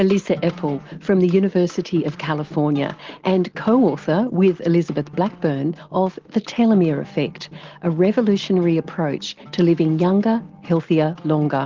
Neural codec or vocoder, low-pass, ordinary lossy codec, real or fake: none; 7.2 kHz; Opus, 16 kbps; real